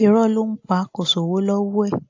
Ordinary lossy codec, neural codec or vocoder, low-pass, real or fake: AAC, 48 kbps; none; 7.2 kHz; real